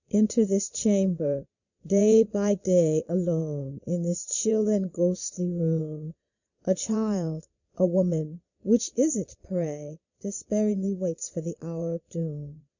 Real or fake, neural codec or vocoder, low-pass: fake; vocoder, 22.05 kHz, 80 mel bands, Vocos; 7.2 kHz